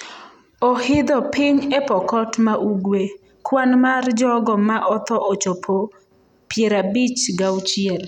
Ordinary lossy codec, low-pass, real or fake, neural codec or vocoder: none; 19.8 kHz; real; none